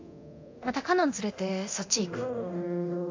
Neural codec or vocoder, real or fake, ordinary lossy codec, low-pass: codec, 24 kHz, 0.9 kbps, DualCodec; fake; AAC, 48 kbps; 7.2 kHz